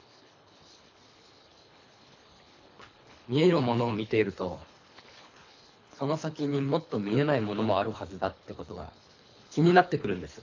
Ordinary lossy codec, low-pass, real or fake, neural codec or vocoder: AAC, 48 kbps; 7.2 kHz; fake; codec, 24 kHz, 3 kbps, HILCodec